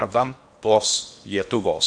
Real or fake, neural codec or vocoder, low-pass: fake; codec, 16 kHz in and 24 kHz out, 0.8 kbps, FocalCodec, streaming, 65536 codes; 9.9 kHz